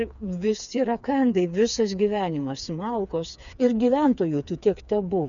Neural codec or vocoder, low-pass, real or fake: codec, 16 kHz, 4 kbps, FreqCodec, smaller model; 7.2 kHz; fake